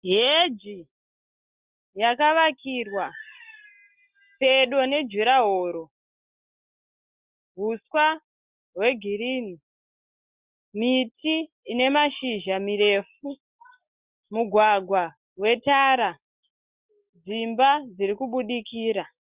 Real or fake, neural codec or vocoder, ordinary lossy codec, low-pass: real; none; Opus, 64 kbps; 3.6 kHz